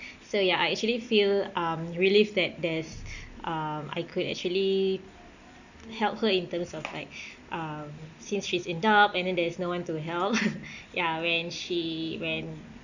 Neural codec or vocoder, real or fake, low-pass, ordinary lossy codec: none; real; 7.2 kHz; none